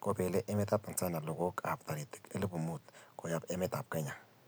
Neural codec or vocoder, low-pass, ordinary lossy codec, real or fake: none; none; none; real